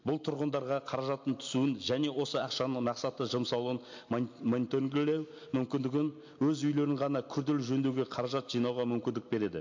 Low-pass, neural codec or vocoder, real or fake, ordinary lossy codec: 7.2 kHz; none; real; MP3, 48 kbps